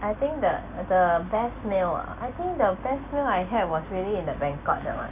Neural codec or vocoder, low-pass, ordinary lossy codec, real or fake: none; 3.6 kHz; none; real